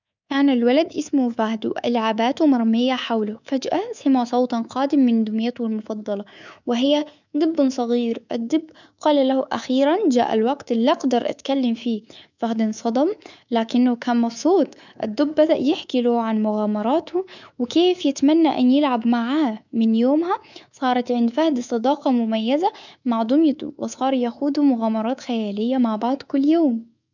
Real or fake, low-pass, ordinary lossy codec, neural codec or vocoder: fake; 7.2 kHz; none; codec, 24 kHz, 3.1 kbps, DualCodec